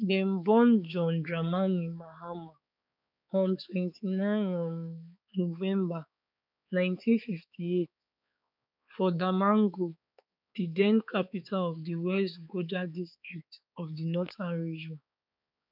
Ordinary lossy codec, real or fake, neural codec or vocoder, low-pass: none; fake; codec, 16 kHz, 4 kbps, X-Codec, HuBERT features, trained on balanced general audio; 5.4 kHz